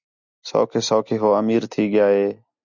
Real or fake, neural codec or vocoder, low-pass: real; none; 7.2 kHz